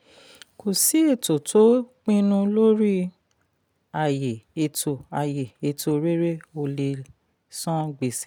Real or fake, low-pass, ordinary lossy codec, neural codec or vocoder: real; none; none; none